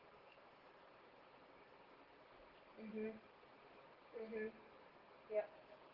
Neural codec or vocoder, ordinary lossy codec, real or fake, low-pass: none; Opus, 16 kbps; real; 5.4 kHz